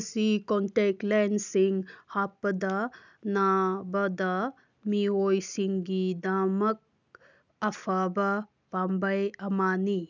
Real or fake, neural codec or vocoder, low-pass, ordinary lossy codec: real; none; 7.2 kHz; none